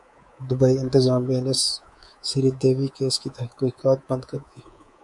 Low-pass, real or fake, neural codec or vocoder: 10.8 kHz; fake; codec, 24 kHz, 3.1 kbps, DualCodec